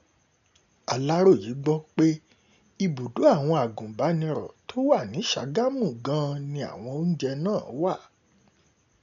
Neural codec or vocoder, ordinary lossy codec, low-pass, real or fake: none; MP3, 96 kbps; 7.2 kHz; real